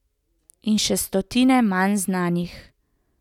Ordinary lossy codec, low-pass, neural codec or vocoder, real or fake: none; 19.8 kHz; none; real